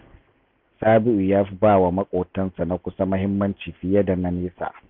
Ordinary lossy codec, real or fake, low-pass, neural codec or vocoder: none; real; 5.4 kHz; none